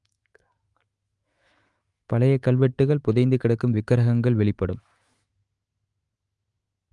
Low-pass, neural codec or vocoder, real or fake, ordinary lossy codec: 10.8 kHz; autoencoder, 48 kHz, 128 numbers a frame, DAC-VAE, trained on Japanese speech; fake; Opus, 32 kbps